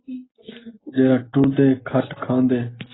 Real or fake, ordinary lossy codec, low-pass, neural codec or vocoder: real; AAC, 16 kbps; 7.2 kHz; none